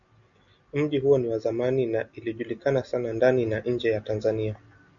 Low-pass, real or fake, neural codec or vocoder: 7.2 kHz; real; none